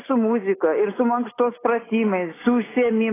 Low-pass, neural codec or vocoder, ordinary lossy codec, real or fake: 3.6 kHz; none; AAC, 16 kbps; real